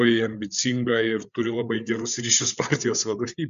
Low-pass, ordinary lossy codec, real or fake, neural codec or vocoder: 7.2 kHz; AAC, 96 kbps; fake; codec, 16 kHz, 8 kbps, FreqCodec, larger model